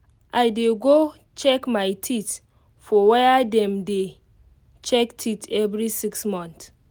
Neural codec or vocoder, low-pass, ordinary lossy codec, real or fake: none; none; none; real